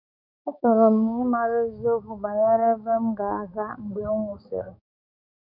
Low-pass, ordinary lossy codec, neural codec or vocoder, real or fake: 5.4 kHz; none; codec, 16 kHz, 4 kbps, X-Codec, HuBERT features, trained on balanced general audio; fake